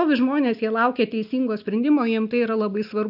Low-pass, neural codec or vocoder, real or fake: 5.4 kHz; none; real